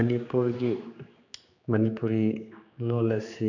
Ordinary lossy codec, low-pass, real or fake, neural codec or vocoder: none; 7.2 kHz; fake; codec, 16 kHz, 2 kbps, X-Codec, HuBERT features, trained on general audio